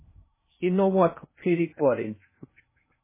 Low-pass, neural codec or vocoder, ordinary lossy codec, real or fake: 3.6 kHz; codec, 16 kHz in and 24 kHz out, 0.6 kbps, FocalCodec, streaming, 2048 codes; MP3, 16 kbps; fake